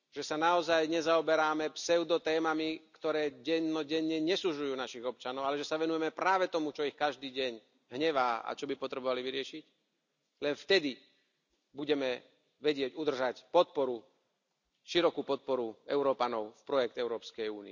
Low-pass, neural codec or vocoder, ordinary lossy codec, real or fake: 7.2 kHz; none; none; real